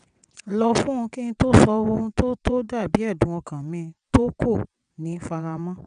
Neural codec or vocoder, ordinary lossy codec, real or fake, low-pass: vocoder, 22.05 kHz, 80 mel bands, WaveNeXt; none; fake; 9.9 kHz